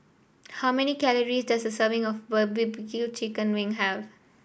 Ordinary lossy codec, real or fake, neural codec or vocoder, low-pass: none; real; none; none